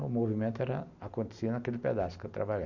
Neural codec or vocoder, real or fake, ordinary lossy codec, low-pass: none; real; none; 7.2 kHz